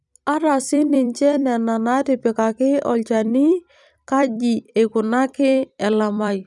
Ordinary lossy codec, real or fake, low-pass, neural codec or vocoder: none; fake; 10.8 kHz; vocoder, 44.1 kHz, 128 mel bands every 256 samples, BigVGAN v2